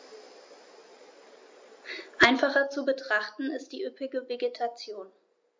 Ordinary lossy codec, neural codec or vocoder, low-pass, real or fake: MP3, 48 kbps; none; 7.2 kHz; real